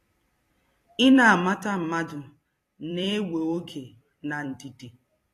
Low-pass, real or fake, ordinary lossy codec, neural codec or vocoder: 14.4 kHz; fake; AAC, 48 kbps; vocoder, 44.1 kHz, 128 mel bands every 256 samples, BigVGAN v2